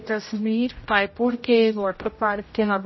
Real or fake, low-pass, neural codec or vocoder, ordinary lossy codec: fake; 7.2 kHz; codec, 16 kHz, 0.5 kbps, X-Codec, HuBERT features, trained on general audio; MP3, 24 kbps